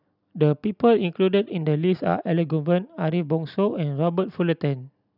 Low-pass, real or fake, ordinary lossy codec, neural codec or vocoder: 5.4 kHz; real; none; none